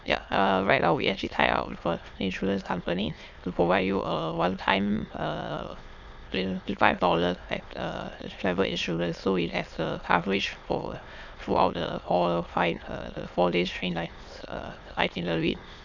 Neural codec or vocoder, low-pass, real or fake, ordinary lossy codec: autoencoder, 22.05 kHz, a latent of 192 numbers a frame, VITS, trained on many speakers; 7.2 kHz; fake; none